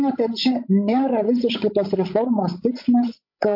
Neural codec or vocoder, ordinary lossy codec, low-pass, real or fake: codec, 16 kHz, 16 kbps, FreqCodec, larger model; MP3, 32 kbps; 5.4 kHz; fake